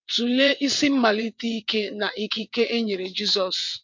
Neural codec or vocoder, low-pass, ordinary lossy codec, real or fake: vocoder, 22.05 kHz, 80 mel bands, WaveNeXt; 7.2 kHz; MP3, 48 kbps; fake